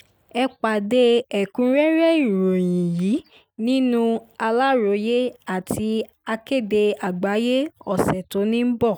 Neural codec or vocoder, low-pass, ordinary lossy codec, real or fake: none; none; none; real